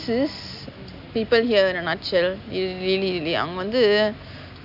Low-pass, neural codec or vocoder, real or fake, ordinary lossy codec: 5.4 kHz; none; real; none